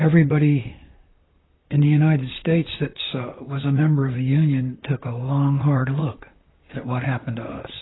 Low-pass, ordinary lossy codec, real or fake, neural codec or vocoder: 7.2 kHz; AAC, 16 kbps; fake; vocoder, 44.1 kHz, 128 mel bands, Pupu-Vocoder